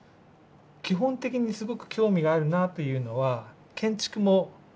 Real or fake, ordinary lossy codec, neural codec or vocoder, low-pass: real; none; none; none